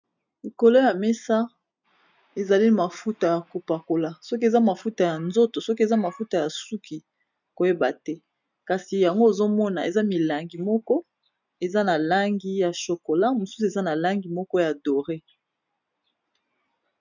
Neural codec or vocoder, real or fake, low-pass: none; real; 7.2 kHz